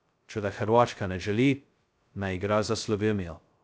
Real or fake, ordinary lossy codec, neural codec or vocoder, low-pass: fake; none; codec, 16 kHz, 0.2 kbps, FocalCodec; none